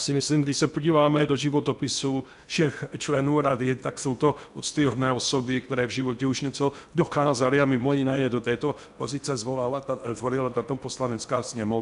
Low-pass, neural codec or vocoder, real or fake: 10.8 kHz; codec, 16 kHz in and 24 kHz out, 0.8 kbps, FocalCodec, streaming, 65536 codes; fake